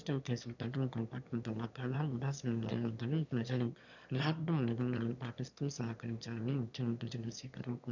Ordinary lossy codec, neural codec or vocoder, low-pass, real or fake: none; autoencoder, 22.05 kHz, a latent of 192 numbers a frame, VITS, trained on one speaker; 7.2 kHz; fake